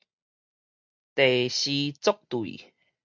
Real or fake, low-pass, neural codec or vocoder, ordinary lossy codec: real; 7.2 kHz; none; Opus, 64 kbps